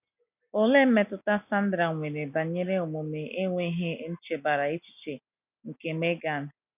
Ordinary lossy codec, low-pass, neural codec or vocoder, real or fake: none; 3.6 kHz; none; real